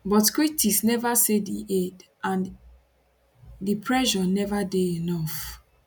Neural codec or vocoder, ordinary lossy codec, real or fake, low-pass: none; none; real; none